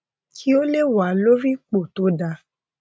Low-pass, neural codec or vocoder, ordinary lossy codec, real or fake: none; none; none; real